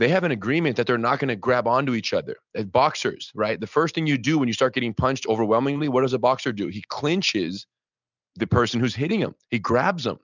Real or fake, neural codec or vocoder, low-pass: real; none; 7.2 kHz